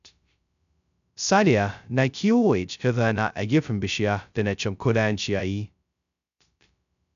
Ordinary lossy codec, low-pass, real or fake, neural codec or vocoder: none; 7.2 kHz; fake; codec, 16 kHz, 0.2 kbps, FocalCodec